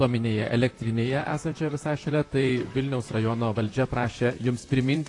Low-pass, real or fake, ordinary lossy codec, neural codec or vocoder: 10.8 kHz; fake; AAC, 48 kbps; vocoder, 44.1 kHz, 128 mel bands, Pupu-Vocoder